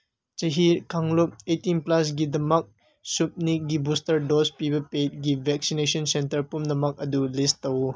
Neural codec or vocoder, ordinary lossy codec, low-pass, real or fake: none; none; none; real